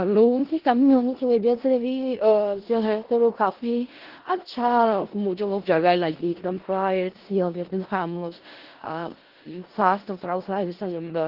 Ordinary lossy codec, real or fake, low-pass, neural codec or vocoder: Opus, 16 kbps; fake; 5.4 kHz; codec, 16 kHz in and 24 kHz out, 0.4 kbps, LongCat-Audio-Codec, four codebook decoder